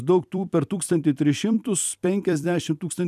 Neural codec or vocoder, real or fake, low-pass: vocoder, 44.1 kHz, 128 mel bands every 256 samples, BigVGAN v2; fake; 14.4 kHz